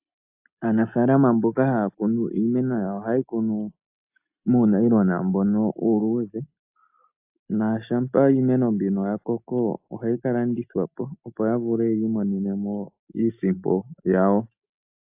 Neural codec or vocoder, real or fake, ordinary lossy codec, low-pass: none; real; AAC, 32 kbps; 3.6 kHz